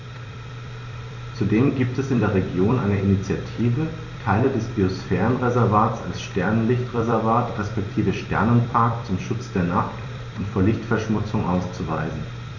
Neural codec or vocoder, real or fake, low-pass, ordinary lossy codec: vocoder, 44.1 kHz, 128 mel bands every 256 samples, BigVGAN v2; fake; 7.2 kHz; none